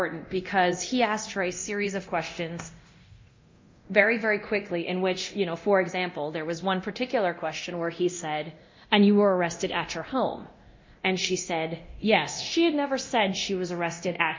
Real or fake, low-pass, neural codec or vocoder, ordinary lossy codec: fake; 7.2 kHz; codec, 24 kHz, 0.9 kbps, DualCodec; MP3, 48 kbps